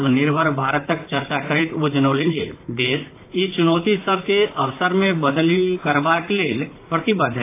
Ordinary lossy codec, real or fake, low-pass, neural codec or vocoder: AAC, 24 kbps; fake; 3.6 kHz; vocoder, 44.1 kHz, 128 mel bands, Pupu-Vocoder